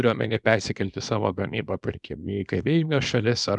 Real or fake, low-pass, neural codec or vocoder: fake; 10.8 kHz; codec, 24 kHz, 0.9 kbps, WavTokenizer, small release